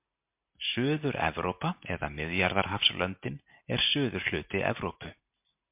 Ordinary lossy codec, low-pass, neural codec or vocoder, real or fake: MP3, 32 kbps; 3.6 kHz; none; real